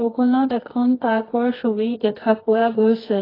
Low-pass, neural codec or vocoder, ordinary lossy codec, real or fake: 5.4 kHz; codec, 24 kHz, 0.9 kbps, WavTokenizer, medium music audio release; AAC, 24 kbps; fake